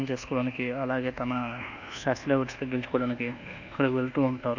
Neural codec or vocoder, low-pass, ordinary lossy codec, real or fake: codec, 24 kHz, 1.2 kbps, DualCodec; 7.2 kHz; none; fake